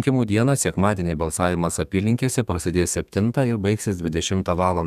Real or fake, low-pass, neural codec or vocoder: fake; 14.4 kHz; codec, 32 kHz, 1.9 kbps, SNAC